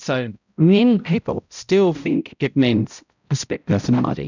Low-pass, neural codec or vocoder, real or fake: 7.2 kHz; codec, 16 kHz, 0.5 kbps, X-Codec, HuBERT features, trained on balanced general audio; fake